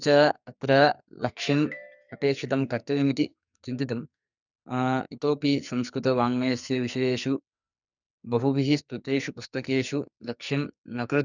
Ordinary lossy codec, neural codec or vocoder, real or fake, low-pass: none; codec, 44.1 kHz, 2.6 kbps, SNAC; fake; 7.2 kHz